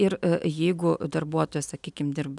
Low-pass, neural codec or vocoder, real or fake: 10.8 kHz; none; real